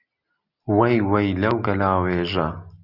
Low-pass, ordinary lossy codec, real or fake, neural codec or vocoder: 5.4 kHz; Opus, 64 kbps; real; none